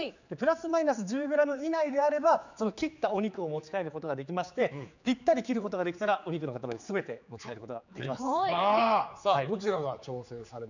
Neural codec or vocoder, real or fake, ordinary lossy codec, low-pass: codec, 16 kHz, 4 kbps, X-Codec, HuBERT features, trained on general audio; fake; none; 7.2 kHz